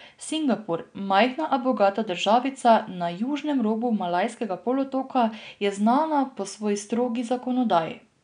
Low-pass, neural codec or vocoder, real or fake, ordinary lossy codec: 9.9 kHz; none; real; none